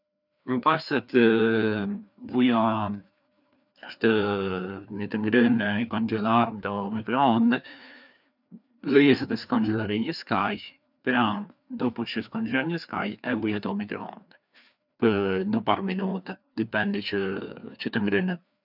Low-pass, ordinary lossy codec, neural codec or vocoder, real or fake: 5.4 kHz; none; codec, 16 kHz, 2 kbps, FreqCodec, larger model; fake